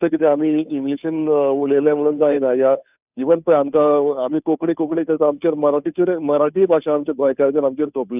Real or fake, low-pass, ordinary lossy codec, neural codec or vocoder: fake; 3.6 kHz; none; codec, 16 kHz, 2 kbps, FunCodec, trained on Chinese and English, 25 frames a second